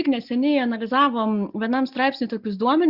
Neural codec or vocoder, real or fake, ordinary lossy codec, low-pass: none; real; Opus, 64 kbps; 5.4 kHz